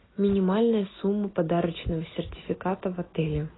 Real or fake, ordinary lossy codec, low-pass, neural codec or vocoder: real; AAC, 16 kbps; 7.2 kHz; none